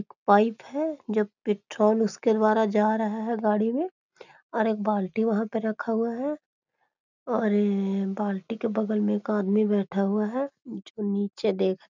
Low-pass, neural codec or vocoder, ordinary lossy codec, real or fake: 7.2 kHz; none; none; real